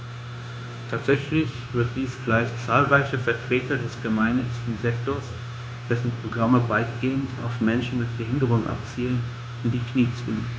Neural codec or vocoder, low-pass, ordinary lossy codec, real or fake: codec, 16 kHz, 0.9 kbps, LongCat-Audio-Codec; none; none; fake